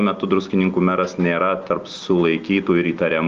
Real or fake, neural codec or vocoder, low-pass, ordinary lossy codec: real; none; 7.2 kHz; Opus, 24 kbps